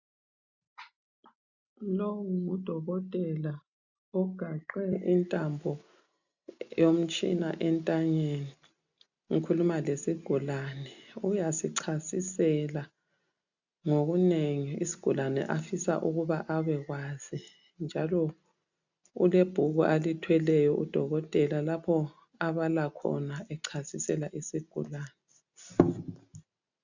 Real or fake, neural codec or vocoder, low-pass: real; none; 7.2 kHz